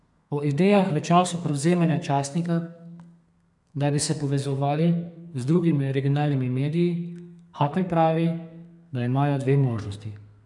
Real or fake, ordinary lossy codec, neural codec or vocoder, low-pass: fake; none; codec, 32 kHz, 1.9 kbps, SNAC; 10.8 kHz